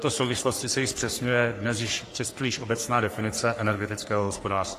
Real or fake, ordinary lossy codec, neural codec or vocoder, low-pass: fake; AAC, 48 kbps; codec, 44.1 kHz, 3.4 kbps, Pupu-Codec; 14.4 kHz